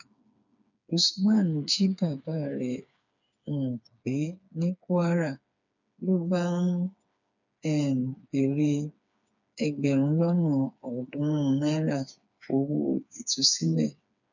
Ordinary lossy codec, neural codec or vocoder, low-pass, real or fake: none; codec, 16 kHz, 4 kbps, FreqCodec, smaller model; 7.2 kHz; fake